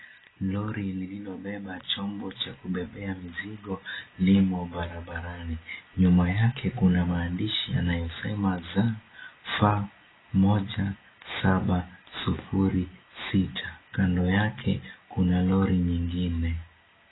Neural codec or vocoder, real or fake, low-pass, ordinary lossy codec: none; real; 7.2 kHz; AAC, 16 kbps